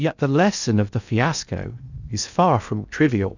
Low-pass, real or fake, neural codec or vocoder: 7.2 kHz; fake; codec, 16 kHz in and 24 kHz out, 0.6 kbps, FocalCodec, streaming, 2048 codes